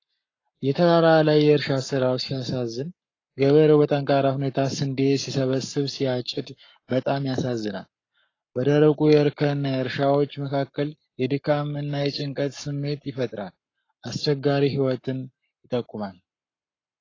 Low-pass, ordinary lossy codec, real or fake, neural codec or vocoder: 7.2 kHz; AAC, 32 kbps; fake; codec, 44.1 kHz, 7.8 kbps, Pupu-Codec